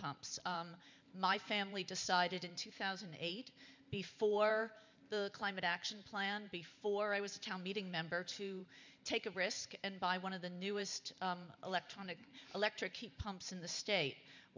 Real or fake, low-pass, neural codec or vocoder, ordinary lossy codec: fake; 7.2 kHz; vocoder, 44.1 kHz, 128 mel bands every 512 samples, BigVGAN v2; MP3, 64 kbps